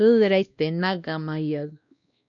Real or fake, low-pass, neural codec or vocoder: fake; 7.2 kHz; codec, 16 kHz, 1 kbps, X-Codec, WavLM features, trained on Multilingual LibriSpeech